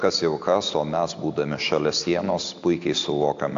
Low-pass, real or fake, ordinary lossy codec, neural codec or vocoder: 7.2 kHz; real; AAC, 64 kbps; none